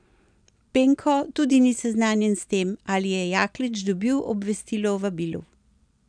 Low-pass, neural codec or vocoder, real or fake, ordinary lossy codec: 9.9 kHz; none; real; none